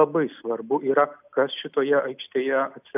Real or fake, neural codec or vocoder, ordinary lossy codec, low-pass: real; none; AAC, 32 kbps; 3.6 kHz